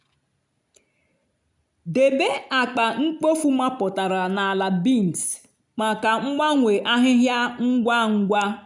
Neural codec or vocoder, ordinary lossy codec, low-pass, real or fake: none; none; 10.8 kHz; real